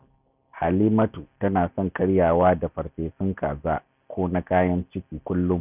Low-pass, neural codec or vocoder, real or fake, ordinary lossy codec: 3.6 kHz; none; real; none